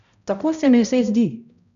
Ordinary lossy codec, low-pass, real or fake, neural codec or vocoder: none; 7.2 kHz; fake; codec, 16 kHz, 0.5 kbps, X-Codec, HuBERT features, trained on balanced general audio